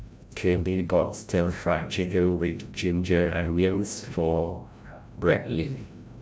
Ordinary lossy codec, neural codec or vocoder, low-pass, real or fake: none; codec, 16 kHz, 0.5 kbps, FreqCodec, larger model; none; fake